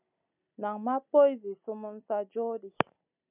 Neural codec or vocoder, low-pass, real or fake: none; 3.6 kHz; real